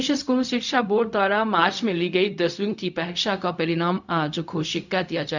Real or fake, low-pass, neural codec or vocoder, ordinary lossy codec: fake; 7.2 kHz; codec, 16 kHz, 0.4 kbps, LongCat-Audio-Codec; none